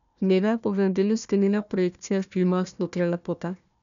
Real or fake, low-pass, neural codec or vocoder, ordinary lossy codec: fake; 7.2 kHz; codec, 16 kHz, 1 kbps, FunCodec, trained on Chinese and English, 50 frames a second; none